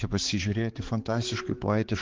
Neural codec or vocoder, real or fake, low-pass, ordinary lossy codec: codec, 16 kHz, 4 kbps, X-Codec, HuBERT features, trained on balanced general audio; fake; 7.2 kHz; Opus, 16 kbps